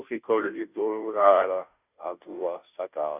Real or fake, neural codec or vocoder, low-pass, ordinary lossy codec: fake; codec, 16 kHz, 0.5 kbps, FunCodec, trained on Chinese and English, 25 frames a second; 3.6 kHz; none